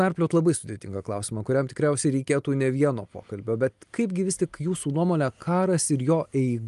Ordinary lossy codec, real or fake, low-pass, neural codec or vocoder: Opus, 32 kbps; real; 10.8 kHz; none